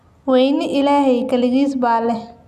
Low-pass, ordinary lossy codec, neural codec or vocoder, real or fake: 14.4 kHz; none; none; real